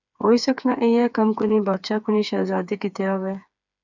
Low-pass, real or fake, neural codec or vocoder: 7.2 kHz; fake; codec, 16 kHz, 4 kbps, FreqCodec, smaller model